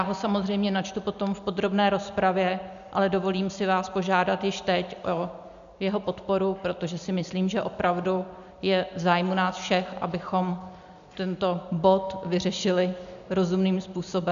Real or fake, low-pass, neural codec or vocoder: real; 7.2 kHz; none